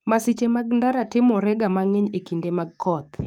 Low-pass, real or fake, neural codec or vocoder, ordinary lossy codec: 19.8 kHz; fake; codec, 44.1 kHz, 7.8 kbps, DAC; none